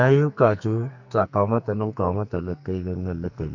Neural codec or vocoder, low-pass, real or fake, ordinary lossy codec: codec, 44.1 kHz, 2.6 kbps, SNAC; 7.2 kHz; fake; none